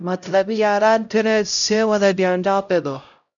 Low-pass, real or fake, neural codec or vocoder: 7.2 kHz; fake; codec, 16 kHz, 0.5 kbps, X-Codec, HuBERT features, trained on LibriSpeech